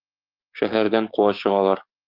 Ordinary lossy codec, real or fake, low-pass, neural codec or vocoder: Opus, 32 kbps; fake; 5.4 kHz; codec, 44.1 kHz, 7.8 kbps, DAC